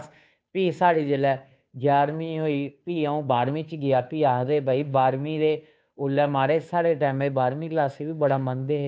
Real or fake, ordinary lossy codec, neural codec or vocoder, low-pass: fake; none; codec, 16 kHz, 2 kbps, FunCodec, trained on Chinese and English, 25 frames a second; none